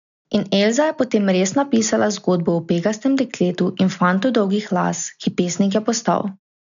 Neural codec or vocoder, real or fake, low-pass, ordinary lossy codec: none; real; 7.2 kHz; none